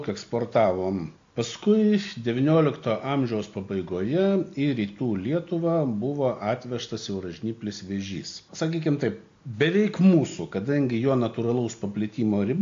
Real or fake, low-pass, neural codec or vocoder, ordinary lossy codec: real; 7.2 kHz; none; AAC, 48 kbps